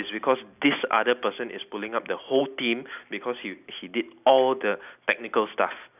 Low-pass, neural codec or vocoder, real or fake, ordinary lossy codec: 3.6 kHz; none; real; none